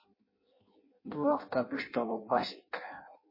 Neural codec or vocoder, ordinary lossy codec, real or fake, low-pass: codec, 16 kHz in and 24 kHz out, 0.6 kbps, FireRedTTS-2 codec; MP3, 24 kbps; fake; 5.4 kHz